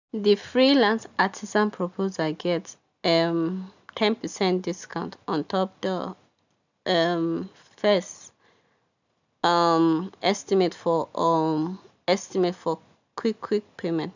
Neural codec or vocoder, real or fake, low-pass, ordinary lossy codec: none; real; 7.2 kHz; none